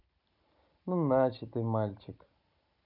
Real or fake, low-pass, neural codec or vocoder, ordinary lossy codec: real; 5.4 kHz; none; none